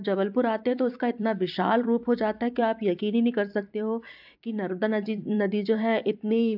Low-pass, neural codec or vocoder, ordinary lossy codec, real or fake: 5.4 kHz; vocoder, 44.1 kHz, 128 mel bands every 256 samples, BigVGAN v2; AAC, 48 kbps; fake